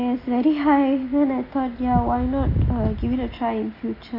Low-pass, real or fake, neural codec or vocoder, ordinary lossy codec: 5.4 kHz; real; none; AAC, 24 kbps